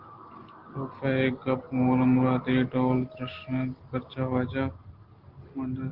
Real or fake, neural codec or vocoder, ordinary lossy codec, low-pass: real; none; Opus, 16 kbps; 5.4 kHz